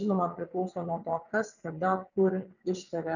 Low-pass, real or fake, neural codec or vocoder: 7.2 kHz; fake; vocoder, 22.05 kHz, 80 mel bands, WaveNeXt